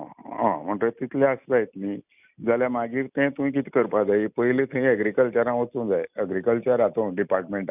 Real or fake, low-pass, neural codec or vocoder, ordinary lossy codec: real; 3.6 kHz; none; none